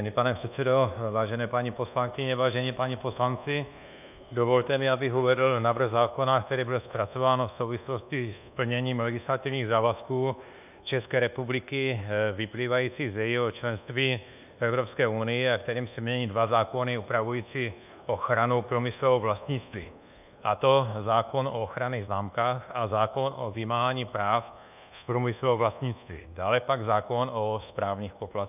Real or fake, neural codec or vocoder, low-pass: fake; codec, 24 kHz, 1.2 kbps, DualCodec; 3.6 kHz